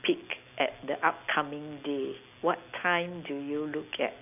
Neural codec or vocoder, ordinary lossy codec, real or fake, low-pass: none; none; real; 3.6 kHz